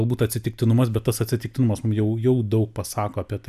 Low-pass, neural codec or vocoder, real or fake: 14.4 kHz; vocoder, 44.1 kHz, 128 mel bands every 256 samples, BigVGAN v2; fake